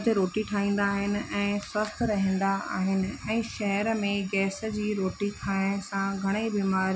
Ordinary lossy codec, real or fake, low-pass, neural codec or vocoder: none; real; none; none